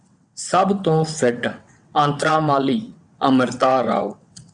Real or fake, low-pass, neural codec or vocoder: fake; 9.9 kHz; vocoder, 22.05 kHz, 80 mel bands, WaveNeXt